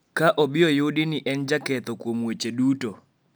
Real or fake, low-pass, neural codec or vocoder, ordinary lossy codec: real; none; none; none